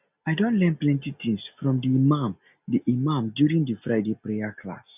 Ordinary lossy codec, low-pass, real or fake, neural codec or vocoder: none; 3.6 kHz; real; none